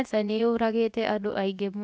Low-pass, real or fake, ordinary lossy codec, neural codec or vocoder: none; fake; none; codec, 16 kHz, 0.7 kbps, FocalCodec